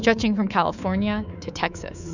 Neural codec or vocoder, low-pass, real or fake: codec, 24 kHz, 3.1 kbps, DualCodec; 7.2 kHz; fake